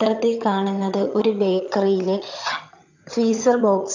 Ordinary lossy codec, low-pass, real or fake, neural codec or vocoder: none; 7.2 kHz; fake; vocoder, 22.05 kHz, 80 mel bands, HiFi-GAN